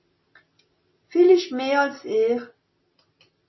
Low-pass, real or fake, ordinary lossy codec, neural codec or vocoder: 7.2 kHz; real; MP3, 24 kbps; none